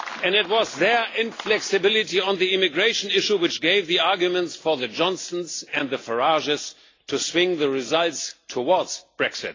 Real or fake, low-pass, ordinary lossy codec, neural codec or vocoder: real; 7.2 kHz; AAC, 32 kbps; none